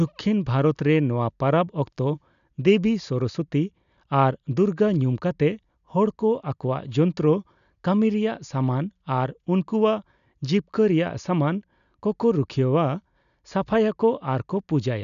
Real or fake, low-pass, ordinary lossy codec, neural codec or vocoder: real; 7.2 kHz; none; none